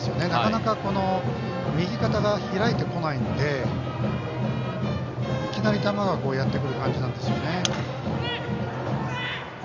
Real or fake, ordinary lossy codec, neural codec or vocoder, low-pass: real; MP3, 64 kbps; none; 7.2 kHz